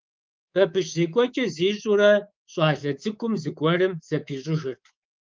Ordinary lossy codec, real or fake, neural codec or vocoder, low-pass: Opus, 32 kbps; fake; codec, 24 kHz, 3.1 kbps, DualCodec; 7.2 kHz